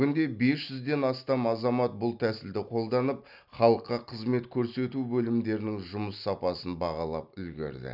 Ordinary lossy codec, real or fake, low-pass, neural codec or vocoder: none; real; 5.4 kHz; none